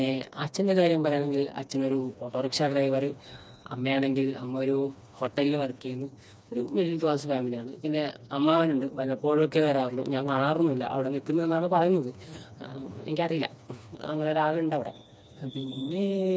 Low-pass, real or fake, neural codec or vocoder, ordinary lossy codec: none; fake; codec, 16 kHz, 2 kbps, FreqCodec, smaller model; none